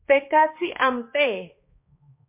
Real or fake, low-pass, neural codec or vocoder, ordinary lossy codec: fake; 3.6 kHz; codec, 16 kHz, 2 kbps, X-Codec, HuBERT features, trained on balanced general audio; MP3, 24 kbps